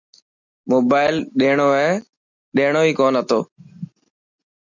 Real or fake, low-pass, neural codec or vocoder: real; 7.2 kHz; none